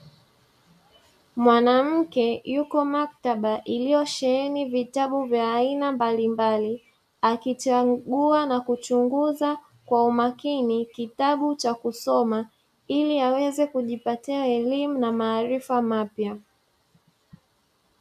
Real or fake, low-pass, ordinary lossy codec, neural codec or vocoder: real; 14.4 kHz; AAC, 96 kbps; none